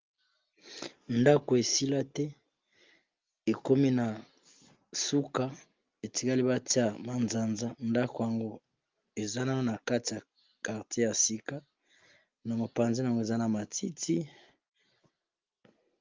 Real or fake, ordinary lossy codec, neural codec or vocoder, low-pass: real; Opus, 32 kbps; none; 7.2 kHz